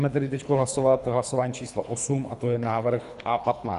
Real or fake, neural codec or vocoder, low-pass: fake; codec, 24 kHz, 3 kbps, HILCodec; 10.8 kHz